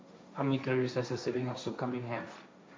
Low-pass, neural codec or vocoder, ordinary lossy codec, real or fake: none; codec, 16 kHz, 1.1 kbps, Voila-Tokenizer; none; fake